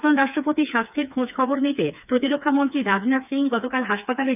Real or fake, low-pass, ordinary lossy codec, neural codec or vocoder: fake; 3.6 kHz; none; codec, 16 kHz, 4 kbps, FreqCodec, smaller model